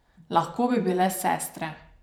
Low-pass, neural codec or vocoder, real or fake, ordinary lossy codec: none; vocoder, 44.1 kHz, 128 mel bands every 512 samples, BigVGAN v2; fake; none